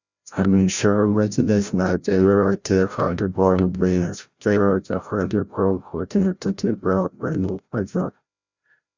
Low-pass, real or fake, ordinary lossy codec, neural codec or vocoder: 7.2 kHz; fake; Opus, 64 kbps; codec, 16 kHz, 0.5 kbps, FreqCodec, larger model